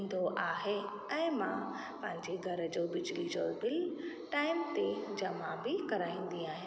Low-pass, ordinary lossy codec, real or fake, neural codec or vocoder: none; none; real; none